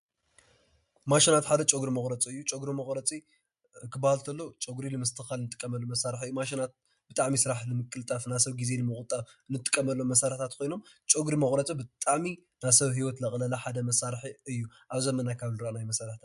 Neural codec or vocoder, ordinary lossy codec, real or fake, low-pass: none; MP3, 64 kbps; real; 10.8 kHz